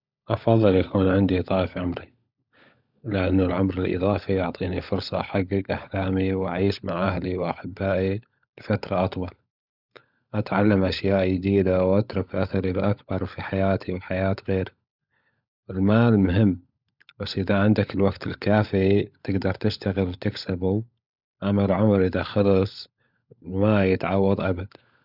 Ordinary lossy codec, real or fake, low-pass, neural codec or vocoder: none; fake; 5.4 kHz; codec, 16 kHz, 16 kbps, FunCodec, trained on LibriTTS, 50 frames a second